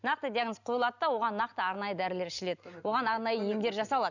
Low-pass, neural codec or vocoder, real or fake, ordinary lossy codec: 7.2 kHz; none; real; none